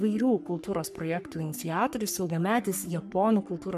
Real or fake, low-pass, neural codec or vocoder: fake; 14.4 kHz; codec, 44.1 kHz, 3.4 kbps, Pupu-Codec